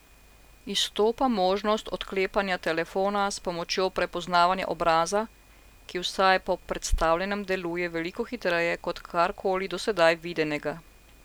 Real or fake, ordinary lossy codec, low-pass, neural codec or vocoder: real; none; none; none